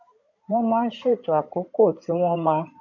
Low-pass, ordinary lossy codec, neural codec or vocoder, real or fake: 7.2 kHz; none; codec, 16 kHz in and 24 kHz out, 2.2 kbps, FireRedTTS-2 codec; fake